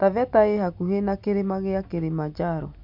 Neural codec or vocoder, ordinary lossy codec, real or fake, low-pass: none; MP3, 48 kbps; real; 5.4 kHz